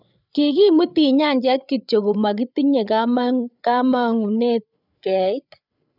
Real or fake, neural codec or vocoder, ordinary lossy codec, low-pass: fake; codec, 16 kHz, 8 kbps, FreqCodec, larger model; none; 5.4 kHz